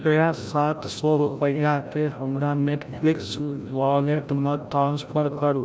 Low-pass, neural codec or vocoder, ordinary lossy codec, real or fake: none; codec, 16 kHz, 0.5 kbps, FreqCodec, larger model; none; fake